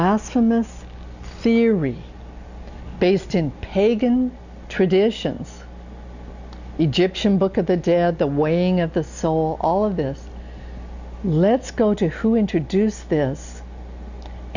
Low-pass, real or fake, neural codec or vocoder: 7.2 kHz; real; none